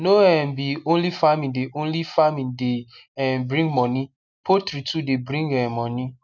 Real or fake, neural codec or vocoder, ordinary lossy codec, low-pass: real; none; none; 7.2 kHz